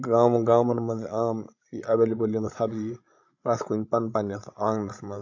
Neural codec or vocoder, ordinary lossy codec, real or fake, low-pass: codec, 16 kHz, 16 kbps, FreqCodec, larger model; AAC, 48 kbps; fake; 7.2 kHz